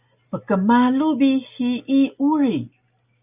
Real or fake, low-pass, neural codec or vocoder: real; 3.6 kHz; none